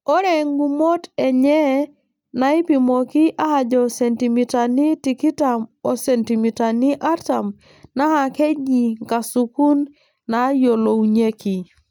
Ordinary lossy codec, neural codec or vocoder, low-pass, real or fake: none; none; 19.8 kHz; real